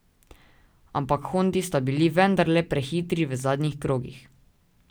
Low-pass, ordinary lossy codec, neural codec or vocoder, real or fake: none; none; none; real